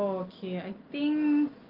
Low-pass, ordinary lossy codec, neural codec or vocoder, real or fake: 5.4 kHz; Opus, 32 kbps; none; real